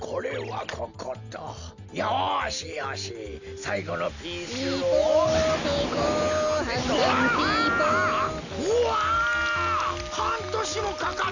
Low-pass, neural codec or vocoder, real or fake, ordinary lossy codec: 7.2 kHz; none; real; none